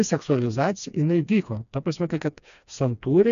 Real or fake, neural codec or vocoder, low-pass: fake; codec, 16 kHz, 2 kbps, FreqCodec, smaller model; 7.2 kHz